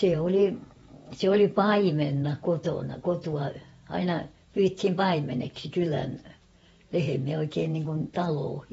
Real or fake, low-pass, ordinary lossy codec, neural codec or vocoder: fake; 19.8 kHz; AAC, 24 kbps; vocoder, 48 kHz, 128 mel bands, Vocos